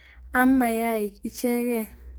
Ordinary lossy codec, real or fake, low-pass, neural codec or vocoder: none; fake; none; codec, 44.1 kHz, 2.6 kbps, SNAC